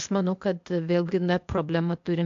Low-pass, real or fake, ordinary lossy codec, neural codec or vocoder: 7.2 kHz; fake; AAC, 64 kbps; codec, 16 kHz, 0.8 kbps, ZipCodec